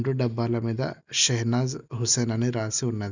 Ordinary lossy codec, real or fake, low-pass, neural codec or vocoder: none; real; 7.2 kHz; none